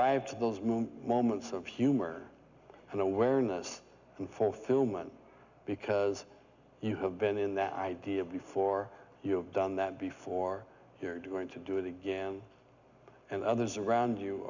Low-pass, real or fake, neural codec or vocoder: 7.2 kHz; real; none